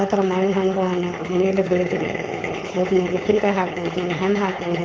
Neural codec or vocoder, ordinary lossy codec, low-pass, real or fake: codec, 16 kHz, 4.8 kbps, FACodec; none; none; fake